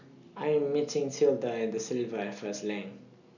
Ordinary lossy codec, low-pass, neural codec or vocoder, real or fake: none; 7.2 kHz; none; real